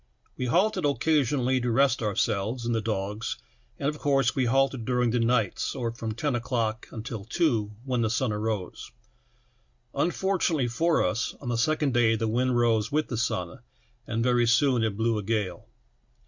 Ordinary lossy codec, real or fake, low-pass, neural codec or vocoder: Opus, 64 kbps; real; 7.2 kHz; none